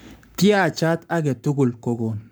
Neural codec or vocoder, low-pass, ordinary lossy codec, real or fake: codec, 44.1 kHz, 7.8 kbps, Pupu-Codec; none; none; fake